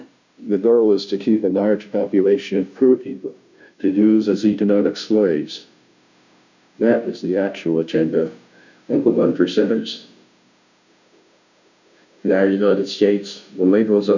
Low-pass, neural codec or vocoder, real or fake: 7.2 kHz; codec, 16 kHz, 0.5 kbps, FunCodec, trained on Chinese and English, 25 frames a second; fake